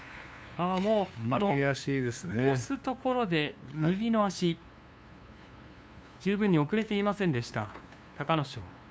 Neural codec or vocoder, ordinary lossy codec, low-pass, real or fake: codec, 16 kHz, 2 kbps, FunCodec, trained on LibriTTS, 25 frames a second; none; none; fake